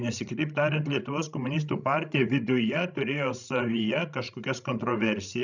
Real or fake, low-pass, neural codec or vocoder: fake; 7.2 kHz; codec, 16 kHz, 16 kbps, FreqCodec, larger model